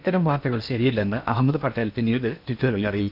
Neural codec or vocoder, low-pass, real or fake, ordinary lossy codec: codec, 16 kHz in and 24 kHz out, 0.8 kbps, FocalCodec, streaming, 65536 codes; 5.4 kHz; fake; none